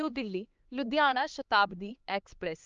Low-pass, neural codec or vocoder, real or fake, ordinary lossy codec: 7.2 kHz; codec, 16 kHz, 2 kbps, X-Codec, HuBERT features, trained on LibriSpeech; fake; Opus, 24 kbps